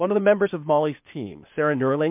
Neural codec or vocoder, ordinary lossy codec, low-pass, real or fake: codec, 16 kHz, 0.8 kbps, ZipCodec; MP3, 32 kbps; 3.6 kHz; fake